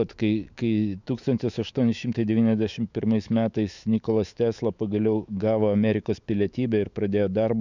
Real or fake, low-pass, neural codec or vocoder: fake; 7.2 kHz; autoencoder, 48 kHz, 128 numbers a frame, DAC-VAE, trained on Japanese speech